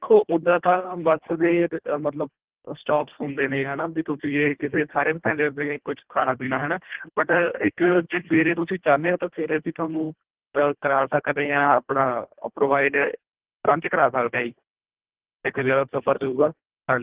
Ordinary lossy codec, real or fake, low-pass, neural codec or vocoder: Opus, 16 kbps; fake; 3.6 kHz; codec, 24 kHz, 1.5 kbps, HILCodec